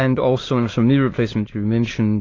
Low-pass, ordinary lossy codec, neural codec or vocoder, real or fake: 7.2 kHz; AAC, 32 kbps; autoencoder, 22.05 kHz, a latent of 192 numbers a frame, VITS, trained on many speakers; fake